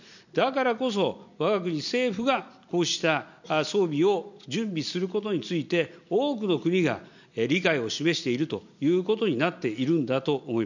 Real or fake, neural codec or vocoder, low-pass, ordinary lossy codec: real; none; 7.2 kHz; none